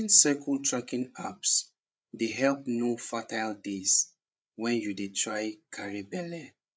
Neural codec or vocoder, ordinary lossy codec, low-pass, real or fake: codec, 16 kHz, 8 kbps, FreqCodec, larger model; none; none; fake